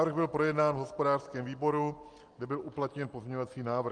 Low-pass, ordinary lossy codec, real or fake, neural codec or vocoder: 9.9 kHz; Opus, 32 kbps; real; none